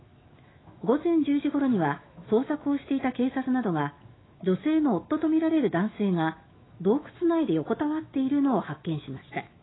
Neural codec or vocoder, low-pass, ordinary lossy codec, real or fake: autoencoder, 48 kHz, 128 numbers a frame, DAC-VAE, trained on Japanese speech; 7.2 kHz; AAC, 16 kbps; fake